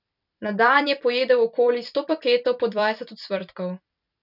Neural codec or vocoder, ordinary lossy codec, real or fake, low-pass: vocoder, 44.1 kHz, 128 mel bands every 256 samples, BigVGAN v2; none; fake; 5.4 kHz